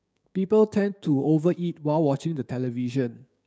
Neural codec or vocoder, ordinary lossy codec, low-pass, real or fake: codec, 16 kHz, 6 kbps, DAC; none; none; fake